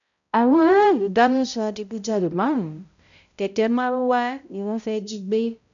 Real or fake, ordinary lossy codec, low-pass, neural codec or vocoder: fake; none; 7.2 kHz; codec, 16 kHz, 0.5 kbps, X-Codec, HuBERT features, trained on balanced general audio